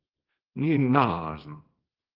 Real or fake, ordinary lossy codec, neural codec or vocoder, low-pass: fake; Opus, 16 kbps; codec, 24 kHz, 0.9 kbps, WavTokenizer, small release; 5.4 kHz